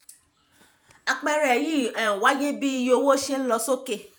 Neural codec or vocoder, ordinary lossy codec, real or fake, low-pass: vocoder, 48 kHz, 128 mel bands, Vocos; none; fake; none